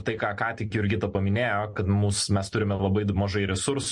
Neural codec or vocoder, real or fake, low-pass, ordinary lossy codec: none; real; 10.8 kHz; MP3, 48 kbps